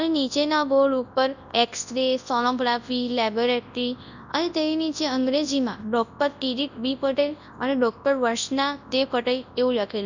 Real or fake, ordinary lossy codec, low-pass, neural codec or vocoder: fake; none; 7.2 kHz; codec, 24 kHz, 0.9 kbps, WavTokenizer, large speech release